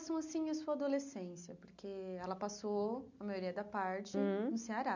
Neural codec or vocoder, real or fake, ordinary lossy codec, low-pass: none; real; none; 7.2 kHz